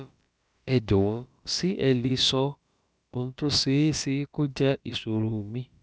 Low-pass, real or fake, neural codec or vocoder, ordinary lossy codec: none; fake; codec, 16 kHz, about 1 kbps, DyCAST, with the encoder's durations; none